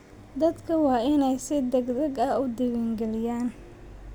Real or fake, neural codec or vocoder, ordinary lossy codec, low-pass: real; none; none; none